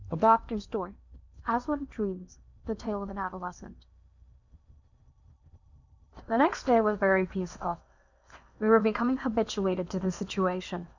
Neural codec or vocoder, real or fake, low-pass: codec, 16 kHz in and 24 kHz out, 0.8 kbps, FocalCodec, streaming, 65536 codes; fake; 7.2 kHz